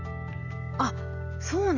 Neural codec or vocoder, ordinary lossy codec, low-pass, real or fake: none; none; 7.2 kHz; real